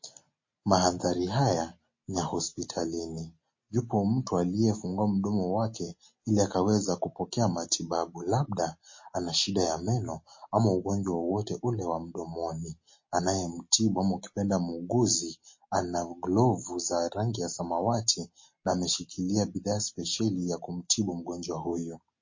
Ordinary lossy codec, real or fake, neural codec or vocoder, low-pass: MP3, 32 kbps; real; none; 7.2 kHz